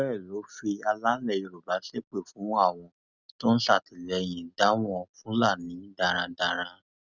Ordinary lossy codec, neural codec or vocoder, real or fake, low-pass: none; none; real; 7.2 kHz